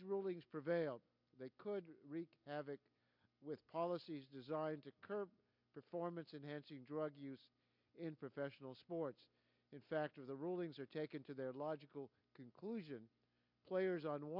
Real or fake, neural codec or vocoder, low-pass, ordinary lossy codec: real; none; 5.4 kHz; MP3, 48 kbps